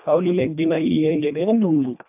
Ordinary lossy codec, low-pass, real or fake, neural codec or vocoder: AAC, 32 kbps; 3.6 kHz; fake; codec, 24 kHz, 1.5 kbps, HILCodec